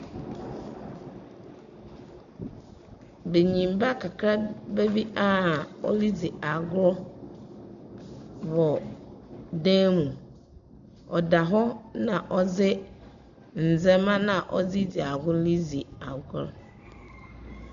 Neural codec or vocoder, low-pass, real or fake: none; 7.2 kHz; real